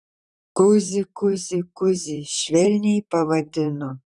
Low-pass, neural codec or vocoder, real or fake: 10.8 kHz; vocoder, 44.1 kHz, 128 mel bands, Pupu-Vocoder; fake